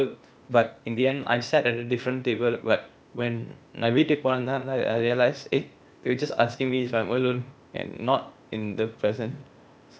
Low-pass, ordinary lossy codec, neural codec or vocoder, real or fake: none; none; codec, 16 kHz, 0.8 kbps, ZipCodec; fake